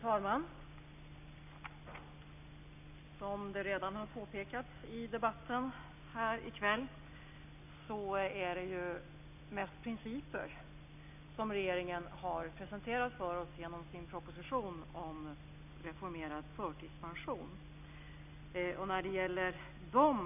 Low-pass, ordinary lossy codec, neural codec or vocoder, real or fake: 3.6 kHz; none; none; real